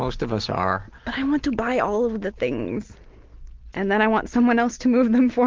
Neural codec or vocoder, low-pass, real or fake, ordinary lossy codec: none; 7.2 kHz; real; Opus, 16 kbps